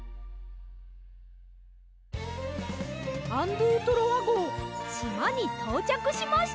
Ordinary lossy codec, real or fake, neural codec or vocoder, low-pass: none; real; none; none